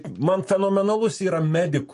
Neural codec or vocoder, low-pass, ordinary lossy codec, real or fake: none; 14.4 kHz; MP3, 48 kbps; real